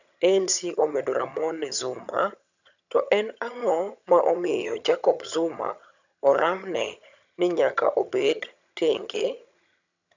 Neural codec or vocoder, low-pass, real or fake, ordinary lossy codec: vocoder, 22.05 kHz, 80 mel bands, HiFi-GAN; 7.2 kHz; fake; none